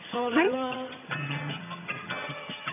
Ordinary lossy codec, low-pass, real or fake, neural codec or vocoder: none; 3.6 kHz; fake; vocoder, 22.05 kHz, 80 mel bands, HiFi-GAN